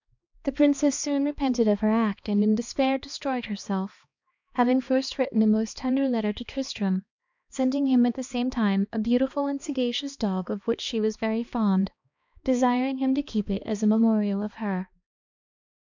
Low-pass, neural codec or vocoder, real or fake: 7.2 kHz; codec, 16 kHz, 2 kbps, X-Codec, HuBERT features, trained on balanced general audio; fake